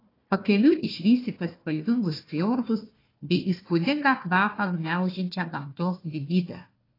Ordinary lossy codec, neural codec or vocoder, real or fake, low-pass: AAC, 24 kbps; codec, 16 kHz, 1 kbps, FunCodec, trained on Chinese and English, 50 frames a second; fake; 5.4 kHz